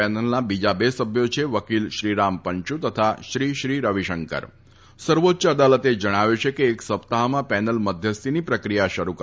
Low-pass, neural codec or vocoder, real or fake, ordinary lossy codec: none; none; real; none